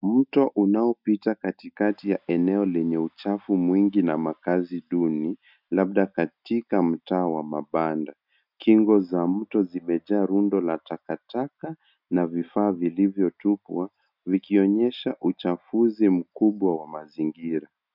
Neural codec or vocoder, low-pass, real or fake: none; 5.4 kHz; real